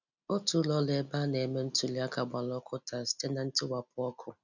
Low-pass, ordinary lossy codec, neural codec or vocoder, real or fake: 7.2 kHz; none; none; real